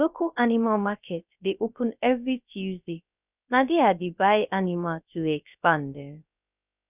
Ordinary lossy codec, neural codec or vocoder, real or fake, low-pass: none; codec, 16 kHz, 0.3 kbps, FocalCodec; fake; 3.6 kHz